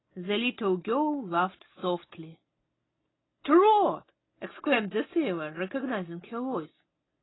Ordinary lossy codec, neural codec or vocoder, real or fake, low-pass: AAC, 16 kbps; none; real; 7.2 kHz